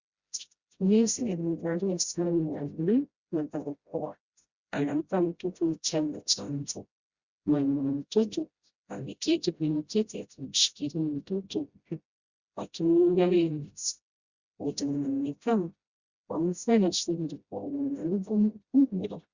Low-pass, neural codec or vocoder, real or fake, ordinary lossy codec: 7.2 kHz; codec, 16 kHz, 0.5 kbps, FreqCodec, smaller model; fake; Opus, 64 kbps